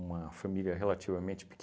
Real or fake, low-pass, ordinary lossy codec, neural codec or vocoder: real; none; none; none